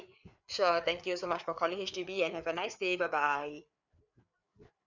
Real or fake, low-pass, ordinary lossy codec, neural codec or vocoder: fake; 7.2 kHz; none; codec, 16 kHz, 4 kbps, FreqCodec, larger model